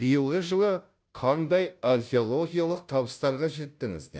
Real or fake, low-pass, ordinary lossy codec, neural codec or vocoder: fake; none; none; codec, 16 kHz, 0.5 kbps, FunCodec, trained on Chinese and English, 25 frames a second